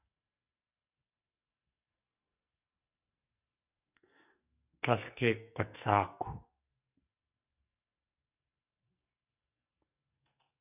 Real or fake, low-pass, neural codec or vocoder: fake; 3.6 kHz; codec, 44.1 kHz, 2.6 kbps, SNAC